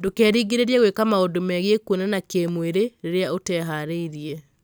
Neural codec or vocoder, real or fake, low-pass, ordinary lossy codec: none; real; none; none